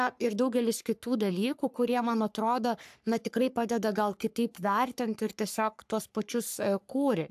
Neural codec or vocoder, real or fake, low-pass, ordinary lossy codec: codec, 44.1 kHz, 3.4 kbps, Pupu-Codec; fake; 14.4 kHz; AAC, 96 kbps